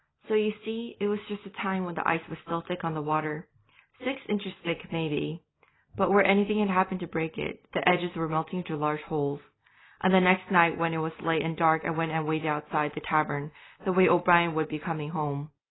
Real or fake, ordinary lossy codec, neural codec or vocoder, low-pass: real; AAC, 16 kbps; none; 7.2 kHz